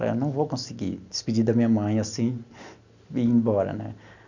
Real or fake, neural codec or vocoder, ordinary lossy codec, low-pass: real; none; none; 7.2 kHz